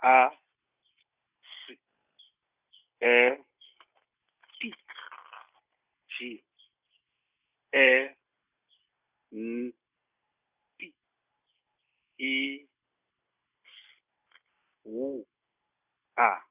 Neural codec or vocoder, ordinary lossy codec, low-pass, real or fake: none; Opus, 64 kbps; 3.6 kHz; real